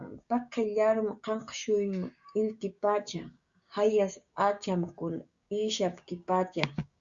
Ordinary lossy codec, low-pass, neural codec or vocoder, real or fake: Opus, 64 kbps; 7.2 kHz; codec, 16 kHz, 6 kbps, DAC; fake